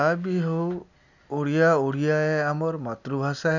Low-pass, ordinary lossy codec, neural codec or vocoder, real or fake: 7.2 kHz; none; none; real